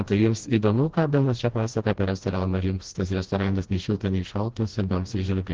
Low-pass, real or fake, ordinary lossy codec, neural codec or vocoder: 7.2 kHz; fake; Opus, 16 kbps; codec, 16 kHz, 1 kbps, FreqCodec, smaller model